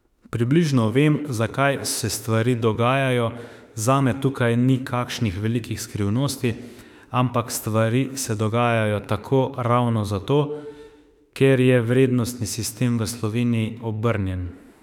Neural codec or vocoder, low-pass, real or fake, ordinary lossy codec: autoencoder, 48 kHz, 32 numbers a frame, DAC-VAE, trained on Japanese speech; 19.8 kHz; fake; none